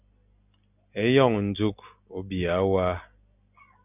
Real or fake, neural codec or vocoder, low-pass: real; none; 3.6 kHz